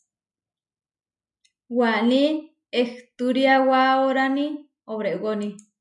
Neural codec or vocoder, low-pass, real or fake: none; 10.8 kHz; real